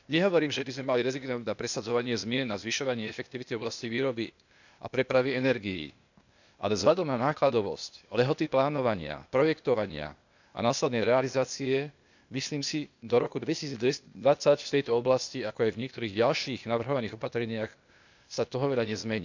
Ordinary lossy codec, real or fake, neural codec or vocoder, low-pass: none; fake; codec, 16 kHz, 0.8 kbps, ZipCodec; 7.2 kHz